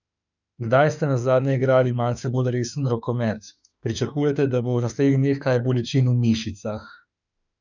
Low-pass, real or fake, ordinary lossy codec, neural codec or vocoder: 7.2 kHz; fake; none; autoencoder, 48 kHz, 32 numbers a frame, DAC-VAE, trained on Japanese speech